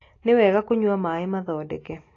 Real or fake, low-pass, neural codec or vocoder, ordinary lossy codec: real; 7.2 kHz; none; AAC, 32 kbps